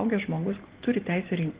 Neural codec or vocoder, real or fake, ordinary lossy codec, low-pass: none; real; Opus, 16 kbps; 3.6 kHz